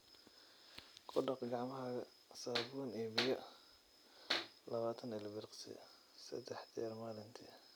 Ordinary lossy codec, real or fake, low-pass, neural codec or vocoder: none; real; none; none